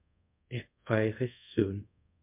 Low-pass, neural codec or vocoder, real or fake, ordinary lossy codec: 3.6 kHz; codec, 24 kHz, 0.5 kbps, DualCodec; fake; MP3, 32 kbps